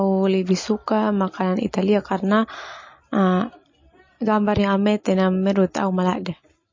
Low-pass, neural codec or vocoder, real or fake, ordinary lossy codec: 7.2 kHz; none; real; MP3, 32 kbps